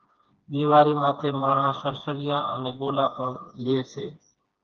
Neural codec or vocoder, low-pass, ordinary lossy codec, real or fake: codec, 16 kHz, 2 kbps, FreqCodec, smaller model; 7.2 kHz; Opus, 24 kbps; fake